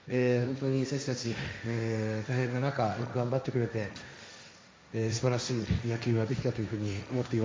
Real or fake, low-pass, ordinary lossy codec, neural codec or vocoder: fake; none; none; codec, 16 kHz, 1.1 kbps, Voila-Tokenizer